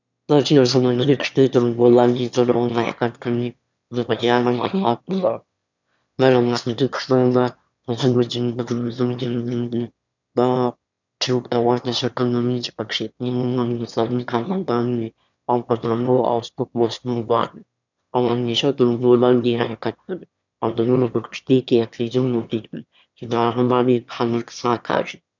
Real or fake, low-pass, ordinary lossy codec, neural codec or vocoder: fake; 7.2 kHz; none; autoencoder, 22.05 kHz, a latent of 192 numbers a frame, VITS, trained on one speaker